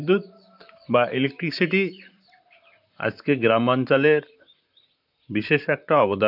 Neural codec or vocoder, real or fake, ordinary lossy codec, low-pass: vocoder, 44.1 kHz, 128 mel bands, Pupu-Vocoder; fake; AAC, 48 kbps; 5.4 kHz